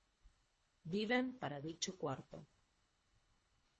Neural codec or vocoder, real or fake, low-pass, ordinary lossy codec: codec, 24 kHz, 3 kbps, HILCodec; fake; 10.8 kHz; MP3, 32 kbps